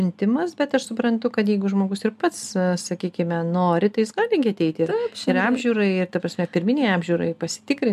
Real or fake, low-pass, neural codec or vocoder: real; 14.4 kHz; none